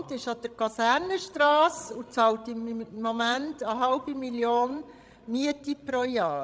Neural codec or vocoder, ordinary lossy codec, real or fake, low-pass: codec, 16 kHz, 16 kbps, FreqCodec, larger model; none; fake; none